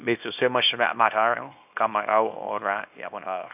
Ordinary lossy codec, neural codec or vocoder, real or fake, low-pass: none; codec, 24 kHz, 0.9 kbps, WavTokenizer, small release; fake; 3.6 kHz